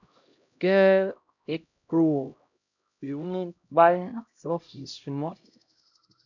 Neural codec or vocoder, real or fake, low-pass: codec, 16 kHz, 0.5 kbps, X-Codec, HuBERT features, trained on LibriSpeech; fake; 7.2 kHz